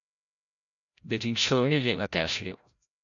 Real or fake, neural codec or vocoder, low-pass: fake; codec, 16 kHz, 0.5 kbps, FreqCodec, larger model; 7.2 kHz